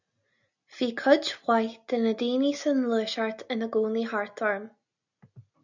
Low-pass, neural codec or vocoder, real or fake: 7.2 kHz; none; real